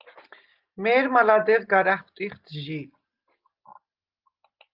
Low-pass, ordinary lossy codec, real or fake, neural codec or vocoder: 5.4 kHz; Opus, 24 kbps; real; none